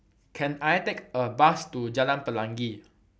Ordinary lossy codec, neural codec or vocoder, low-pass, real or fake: none; none; none; real